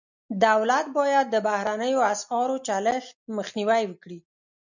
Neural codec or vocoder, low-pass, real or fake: none; 7.2 kHz; real